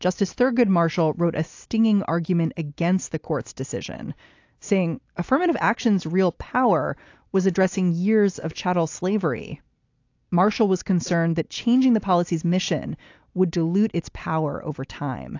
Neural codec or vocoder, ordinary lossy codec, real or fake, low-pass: none; AAC, 48 kbps; real; 7.2 kHz